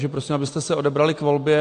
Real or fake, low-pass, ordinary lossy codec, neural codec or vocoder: real; 9.9 kHz; AAC, 48 kbps; none